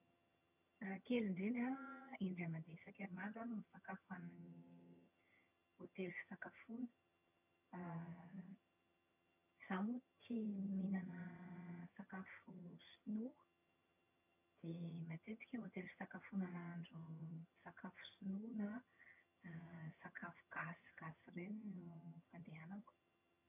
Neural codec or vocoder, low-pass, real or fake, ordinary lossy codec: vocoder, 22.05 kHz, 80 mel bands, HiFi-GAN; 3.6 kHz; fake; none